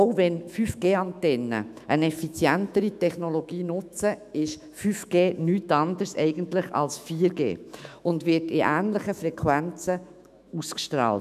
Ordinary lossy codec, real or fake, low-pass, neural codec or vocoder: none; fake; 14.4 kHz; autoencoder, 48 kHz, 128 numbers a frame, DAC-VAE, trained on Japanese speech